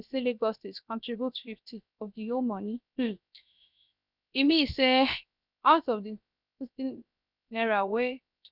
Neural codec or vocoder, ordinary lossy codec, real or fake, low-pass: codec, 16 kHz, 0.7 kbps, FocalCodec; none; fake; 5.4 kHz